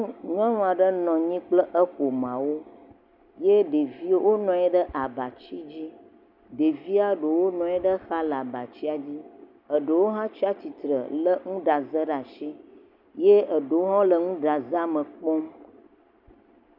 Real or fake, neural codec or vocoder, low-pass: real; none; 5.4 kHz